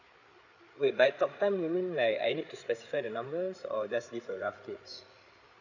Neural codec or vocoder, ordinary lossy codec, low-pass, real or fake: codec, 16 kHz, 8 kbps, FreqCodec, larger model; none; 7.2 kHz; fake